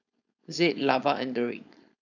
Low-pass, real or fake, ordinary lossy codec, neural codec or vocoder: 7.2 kHz; fake; none; codec, 16 kHz, 4.8 kbps, FACodec